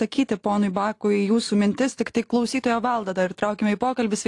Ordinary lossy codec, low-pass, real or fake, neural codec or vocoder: AAC, 48 kbps; 10.8 kHz; real; none